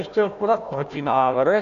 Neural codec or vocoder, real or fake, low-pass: codec, 16 kHz, 1 kbps, FunCodec, trained on Chinese and English, 50 frames a second; fake; 7.2 kHz